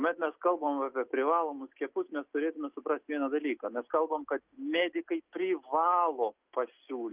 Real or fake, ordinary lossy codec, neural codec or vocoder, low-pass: real; Opus, 24 kbps; none; 3.6 kHz